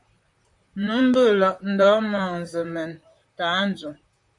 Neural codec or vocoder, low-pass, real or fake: vocoder, 44.1 kHz, 128 mel bands, Pupu-Vocoder; 10.8 kHz; fake